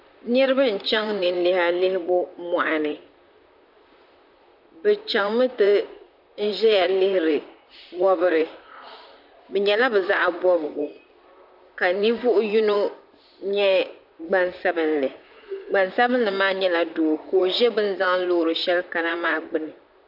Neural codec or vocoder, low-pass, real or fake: vocoder, 44.1 kHz, 128 mel bands, Pupu-Vocoder; 5.4 kHz; fake